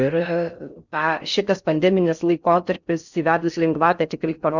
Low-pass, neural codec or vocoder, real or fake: 7.2 kHz; codec, 16 kHz in and 24 kHz out, 0.6 kbps, FocalCodec, streaming, 4096 codes; fake